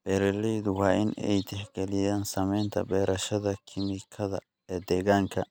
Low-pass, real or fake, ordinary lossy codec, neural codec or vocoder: 19.8 kHz; real; none; none